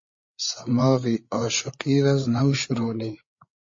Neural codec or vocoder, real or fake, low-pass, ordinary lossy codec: codec, 16 kHz, 8 kbps, FreqCodec, larger model; fake; 7.2 kHz; MP3, 32 kbps